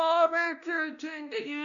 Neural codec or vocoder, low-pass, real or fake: codec, 16 kHz, 1 kbps, X-Codec, WavLM features, trained on Multilingual LibriSpeech; 7.2 kHz; fake